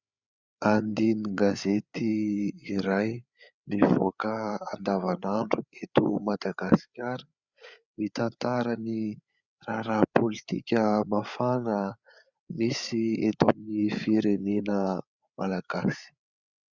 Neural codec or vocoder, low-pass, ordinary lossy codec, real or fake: codec, 16 kHz, 8 kbps, FreqCodec, larger model; 7.2 kHz; Opus, 64 kbps; fake